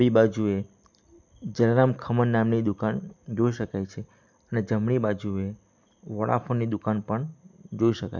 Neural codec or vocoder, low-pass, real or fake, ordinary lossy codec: none; 7.2 kHz; real; none